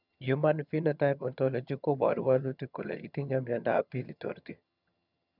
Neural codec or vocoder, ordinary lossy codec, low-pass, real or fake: vocoder, 22.05 kHz, 80 mel bands, HiFi-GAN; none; 5.4 kHz; fake